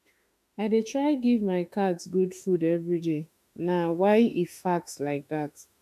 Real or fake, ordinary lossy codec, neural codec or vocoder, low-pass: fake; MP3, 96 kbps; autoencoder, 48 kHz, 32 numbers a frame, DAC-VAE, trained on Japanese speech; 14.4 kHz